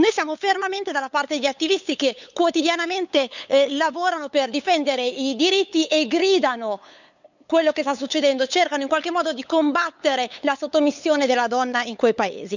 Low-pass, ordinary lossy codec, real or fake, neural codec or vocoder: 7.2 kHz; none; fake; codec, 16 kHz, 8 kbps, FunCodec, trained on LibriTTS, 25 frames a second